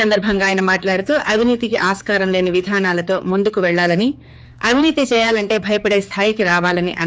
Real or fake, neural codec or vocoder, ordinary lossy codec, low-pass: fake; codec, 16 kHz, 4 kbps, X-Codec, HuBERT features, trained on general audio; none; none